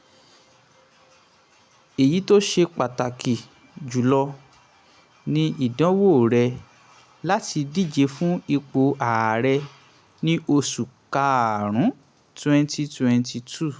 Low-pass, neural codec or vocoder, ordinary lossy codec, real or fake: none; none; none; real